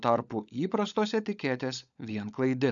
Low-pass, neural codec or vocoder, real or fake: 7.2 kHz; codec, 16 kHz, 16 kbps, FunCodec, trained on LibriTTS, 50 frames a second; fake